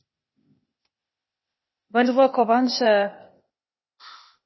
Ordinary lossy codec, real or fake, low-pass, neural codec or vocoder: MP3, 24 kbps; fake; 7.2 kHz; codec, 16 kHz, 0.8 kbps, ZipCodec